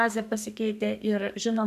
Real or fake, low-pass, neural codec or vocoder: fake; 14.4 kHz; codec, 32 kHz, 1.9 kbps, SNAC